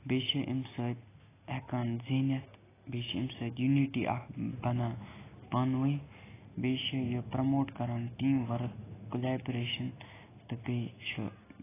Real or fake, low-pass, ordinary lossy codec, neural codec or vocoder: real; 3.6 kHz; AAC, 16 kbps; none